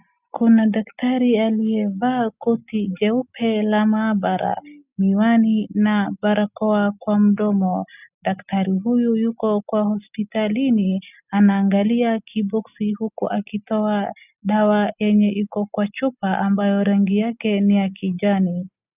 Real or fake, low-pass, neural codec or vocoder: real; 3.6 kHz; none